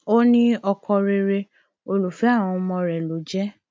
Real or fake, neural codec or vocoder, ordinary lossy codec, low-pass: real; none; none; none